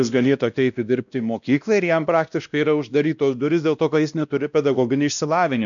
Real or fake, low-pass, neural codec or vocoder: fake; 7.2 kHz; codec, 16 kHz, 1 kbps, X-Codec, WavLM features, trained on Multilingual LibriSpeech